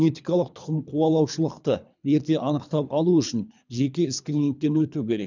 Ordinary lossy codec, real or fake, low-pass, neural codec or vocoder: none; fake; 7.2 kHz; codec, 24 kHz, 3 kbps, HILCodec